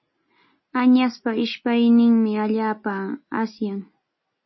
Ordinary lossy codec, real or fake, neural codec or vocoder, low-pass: MP3, 24 kbps; real; none; 7.2 kHz